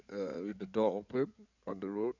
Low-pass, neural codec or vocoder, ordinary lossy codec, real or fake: 7.2 kHz; codec, 16 kHz in and 24 kHz out, 2.2 kbps, FireRedTTS-2 codec; none; fake